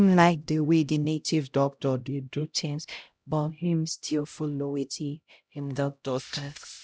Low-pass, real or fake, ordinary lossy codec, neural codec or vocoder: none; fake; none; codec, 16 kHz, 0.5 kbps, X-Codec, HuBERT features, trained on LibriSpeech